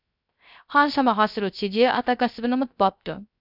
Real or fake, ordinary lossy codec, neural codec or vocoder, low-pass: fake; none; codec, 16 kHz, 0.3 kbps, FocalCodec; 5.4 kHz